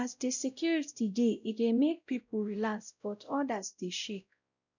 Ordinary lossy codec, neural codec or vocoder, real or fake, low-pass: none; codec, 16 kHz, 0.5 kbps, X-Codec, WavLM features, trained on Multilingual LibriSpeech; fake; 7.2 kHz